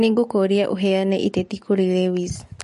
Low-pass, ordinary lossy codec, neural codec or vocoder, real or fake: 14.4 kHz; MP3, 48 kbps; none; real